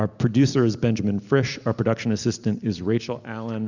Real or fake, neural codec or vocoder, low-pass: real; none; 7.2 kHz